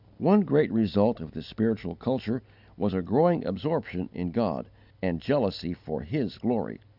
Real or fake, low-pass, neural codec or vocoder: real; 5.4 kHz; none